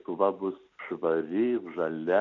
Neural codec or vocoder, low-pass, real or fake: none; 7.2 kHz; real